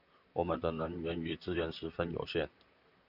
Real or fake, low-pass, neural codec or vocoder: fake; 5.4 kHz; vocoder, 44.1 kHz, 128 mel bands, Pupu-Vocoder